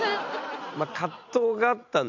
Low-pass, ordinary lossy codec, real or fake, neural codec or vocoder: 7.2 kHz; none; real; none